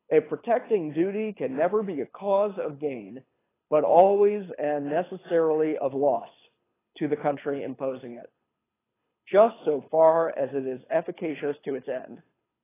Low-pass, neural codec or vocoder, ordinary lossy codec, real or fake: 3.6 kHz; codec, 24 kHz, 6 kbps, HILCodec; AAC, 16 kbps; fake